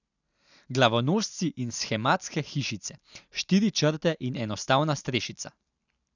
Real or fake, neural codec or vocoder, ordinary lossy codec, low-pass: real; none; none; 7.2 kHz